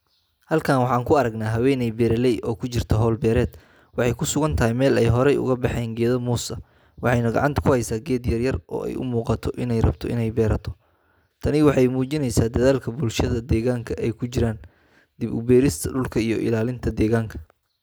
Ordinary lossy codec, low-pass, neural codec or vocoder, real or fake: none; none; none; real